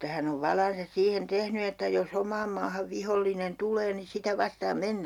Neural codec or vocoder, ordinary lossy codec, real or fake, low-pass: none; none; real; 19.8 kHz